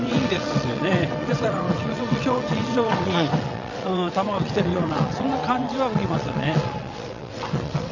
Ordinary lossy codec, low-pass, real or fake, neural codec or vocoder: none; 7.2 kHz; fake; vocoder, 22.05 kHz, 80 mel bands, WaveNeXt